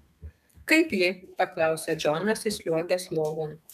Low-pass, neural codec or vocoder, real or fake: 14.4 kHz; codec, 32 kHz, 1.9 kbps, SNAC; fake